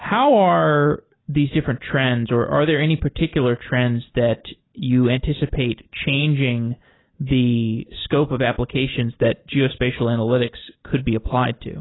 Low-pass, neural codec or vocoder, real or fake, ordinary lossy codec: 7.2 kHz; none; real; AAC, 16 kbps